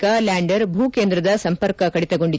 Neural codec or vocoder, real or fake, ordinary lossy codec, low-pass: none; real; none; none